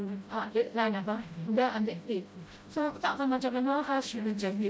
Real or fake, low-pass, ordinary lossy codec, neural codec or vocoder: fake; none; none; codec, 16 kHz, 0.5 kbps, FreqCodec, smaller model